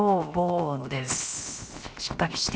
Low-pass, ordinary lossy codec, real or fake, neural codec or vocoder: none; none; fake; codec, 16 kHz, 0.7 kbps, FocalCodec